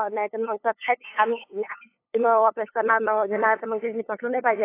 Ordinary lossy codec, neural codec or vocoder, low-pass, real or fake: AAC, 24 kbps; codec, 16 kHz, 8 kbps, FunCodec, trained on LibriTTS, 25 frames a second; 3.6 kHz; fake